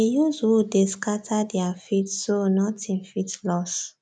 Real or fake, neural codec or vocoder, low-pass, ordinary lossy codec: real; none; none; none